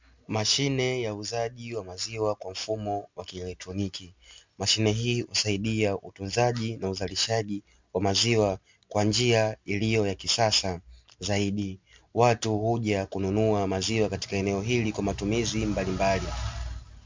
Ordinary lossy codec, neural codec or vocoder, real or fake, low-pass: MP3, 64 kbps; none; real; 7.2 kHz